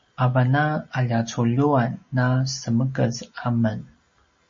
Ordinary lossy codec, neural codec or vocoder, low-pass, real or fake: MP3, 32 kbps; none; 7.2 kHz; real